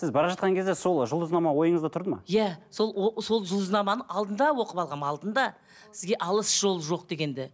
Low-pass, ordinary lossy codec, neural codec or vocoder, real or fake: none; none; none; real